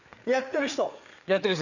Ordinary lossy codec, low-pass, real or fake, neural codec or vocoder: none; 7.2 kHz; fake; codec, 16 kHz, 4 kbps, FreqCodec, larger model